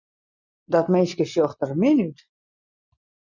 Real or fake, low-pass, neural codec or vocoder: real; 7.2 kHz; none